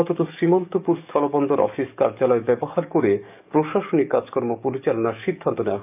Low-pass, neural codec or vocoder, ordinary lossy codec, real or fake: 3.6 kHz; codec, 44.1 kHz, 7.8 kbps, DAC; none; fake